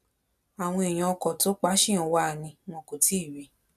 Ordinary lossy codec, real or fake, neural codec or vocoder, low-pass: none; real; none; 14.4 kHz